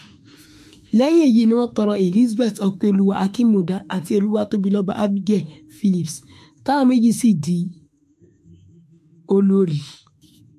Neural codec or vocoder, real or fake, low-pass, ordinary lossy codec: autoencoder, 48 kHz, 32 numbers a frame, DAC-VAE, trained on Japanese speech; fake; 14.4 kHz; MP3, 64 kbps